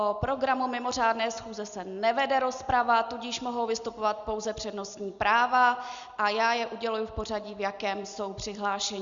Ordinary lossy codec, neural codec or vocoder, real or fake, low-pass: Opus, 64 kbps; none; real; 7.2 kHz